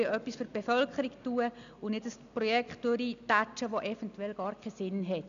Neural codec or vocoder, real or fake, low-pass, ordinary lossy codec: none; real; 7.2 kHz; none